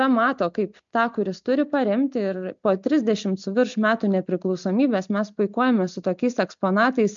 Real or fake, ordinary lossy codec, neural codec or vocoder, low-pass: real; MP3, 64 kbps; none; 7.2 kHz